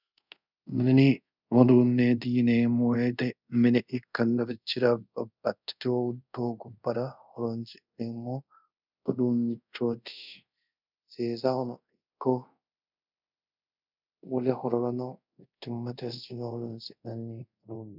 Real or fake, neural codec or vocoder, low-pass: fake; codec, 24 kHz, 0.5 kbps, DualCodec; 5.4 kHz